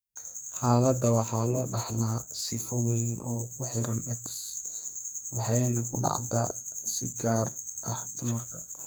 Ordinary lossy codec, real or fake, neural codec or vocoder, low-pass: none; fake; codec, 44.1 kHz, 2.6 kbps, SNAC; none